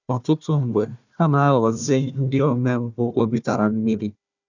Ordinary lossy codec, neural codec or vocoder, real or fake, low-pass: none; codec, 16 kHz, 1 kbps, FunCodec, trained on Chinese and English, 50 frames a second; fake; 7.2 kHz